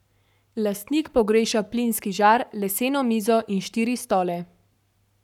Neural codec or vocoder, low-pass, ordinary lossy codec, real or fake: codec, 44.1 kHz, 7.8 kbps, Pupu-Codec; 19.8 kHz; none; fake